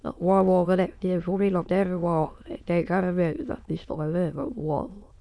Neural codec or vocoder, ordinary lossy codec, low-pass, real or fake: autoencoder, 22.05 kHz, a latent of 192 numbers a frame, VITS, trained on many speakers; none; none; fake